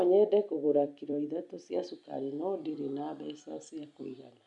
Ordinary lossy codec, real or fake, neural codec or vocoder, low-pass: none; fake; vocoder, 44.1 kHz, 128 mel bands every 256 samples, BigVGAN v2; 10.8 kHz